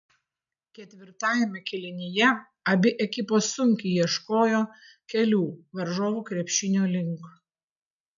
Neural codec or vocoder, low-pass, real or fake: none; 7.2 kHz; real